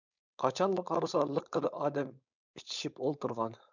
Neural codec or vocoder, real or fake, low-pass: codec, 16 kHz, 4.8 kbps, FACodec; fake; 7.2 kHz